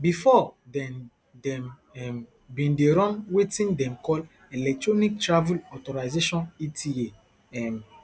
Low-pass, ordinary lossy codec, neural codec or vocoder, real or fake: none; none; none; real